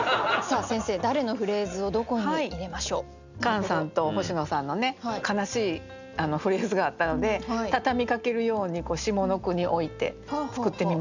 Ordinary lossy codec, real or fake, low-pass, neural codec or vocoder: none; real; 7.2 kHz; none